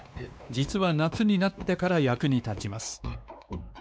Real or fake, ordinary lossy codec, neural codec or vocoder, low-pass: fake; none; codec, 16 kHz, 2 kbps, X-Codec, WavLM features, trained on Multilingual LibriSpeech; none